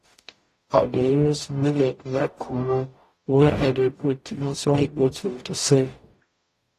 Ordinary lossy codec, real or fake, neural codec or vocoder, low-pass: AAC, 48 kbps; fake; codec, 44.1 kHz, 0.9 kbps, DAC; 14.4 kHz